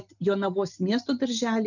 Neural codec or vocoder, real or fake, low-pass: none; real; 7.2 kHz